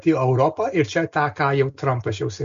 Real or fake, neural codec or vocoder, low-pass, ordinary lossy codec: real; none; 7.2 kHz; MP3, 48 kbps